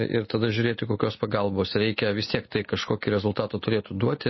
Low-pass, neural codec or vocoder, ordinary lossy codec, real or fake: 7.2 kHz; none; MP3, 24 kbps; real